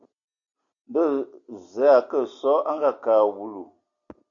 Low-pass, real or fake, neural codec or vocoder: 7.2 kHz; real; none